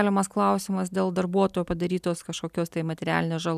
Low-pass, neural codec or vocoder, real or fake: 14.4 kHz; none; real